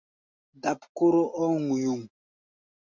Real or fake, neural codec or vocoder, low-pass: real; none; 7.2 kHz